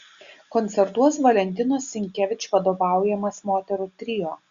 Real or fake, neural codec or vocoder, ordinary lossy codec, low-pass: real; none; Opus, 64 kbps; 7.2 kHz